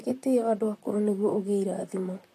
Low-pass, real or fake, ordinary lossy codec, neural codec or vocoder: 14.4 kHz; fake; AAC, 48 kbps; vocoder, 44.1 kHz, 128 mel bands, Pupu-Vocoder